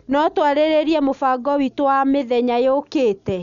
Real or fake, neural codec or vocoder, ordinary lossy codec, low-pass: real; none; none; 7.2 kHz